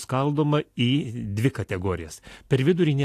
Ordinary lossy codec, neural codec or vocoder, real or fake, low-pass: AAC, 64 kbps; none; real; 14.4 kHz